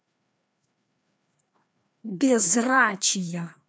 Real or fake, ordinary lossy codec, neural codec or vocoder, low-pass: fake; none; codec, 16 kHz, 2 kbps, FreqCodec, larger model; none